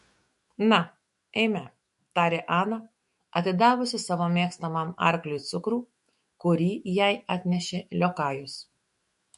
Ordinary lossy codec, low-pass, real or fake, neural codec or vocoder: MP3, 48 kbps; 14.4 kHz; fake; autoencoder, 48 kHz, 128 numbers a frame, DAC-VAE, trained on Japanese speech